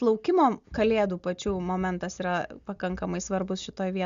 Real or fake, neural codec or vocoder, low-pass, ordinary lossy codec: real; none; 7.2 kHz; Opus, 64 kbps